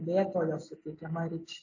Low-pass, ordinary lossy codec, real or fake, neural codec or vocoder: 7.2 kHz; AAC, 48 kbps; real; none